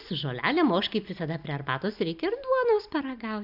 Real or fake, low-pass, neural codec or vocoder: real; 5.4 kHz; none